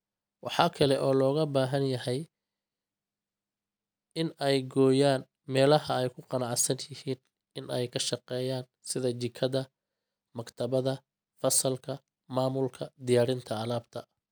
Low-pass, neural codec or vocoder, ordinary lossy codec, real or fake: none; none; none; real